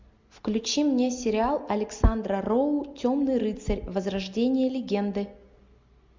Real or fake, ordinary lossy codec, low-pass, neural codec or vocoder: real; MP3, 64 kbps; 7.2 kHz; none